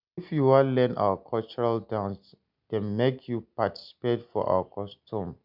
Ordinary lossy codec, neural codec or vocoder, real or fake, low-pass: none; none; real; 5.4 kHz